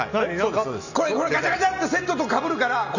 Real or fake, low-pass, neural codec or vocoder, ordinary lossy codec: real; 7.2 kHz; none; none